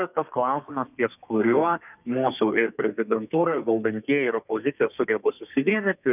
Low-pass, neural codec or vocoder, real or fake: 3.6 kHz; codec, 32 kHz, 1.9 kbps, SNAC; fake